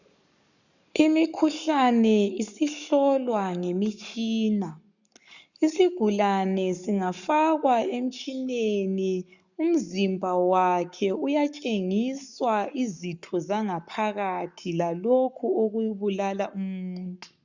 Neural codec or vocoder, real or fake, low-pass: codec, 44.1 kHz, 7.8 kbps, Pupu-Codec; fake; 7.2 kHz